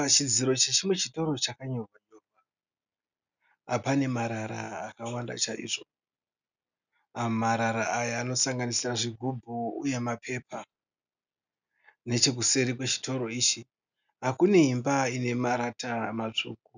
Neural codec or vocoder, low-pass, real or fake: none; 7.2 kHz; real